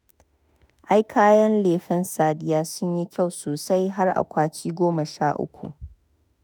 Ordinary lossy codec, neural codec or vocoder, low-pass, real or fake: none; autoencoder, 48 kHz, 32 numbers a frame, DAC-VAE, trained on Japanese speech; none; fake